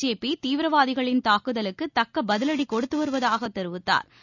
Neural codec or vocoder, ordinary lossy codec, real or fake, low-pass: none; none; real; 7.2 kHz